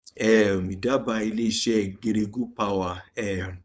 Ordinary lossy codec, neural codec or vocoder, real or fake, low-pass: none; codec, 16 kHz, 4.8 kbps, FACodec; fake; none